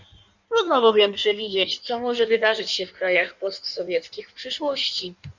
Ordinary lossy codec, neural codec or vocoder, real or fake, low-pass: Opus, 64 kbps; codec, 16 kHz in and 24 kHz out, 1.1 kbps, FireRedTTS-2 codec; fake; 7.2 kHz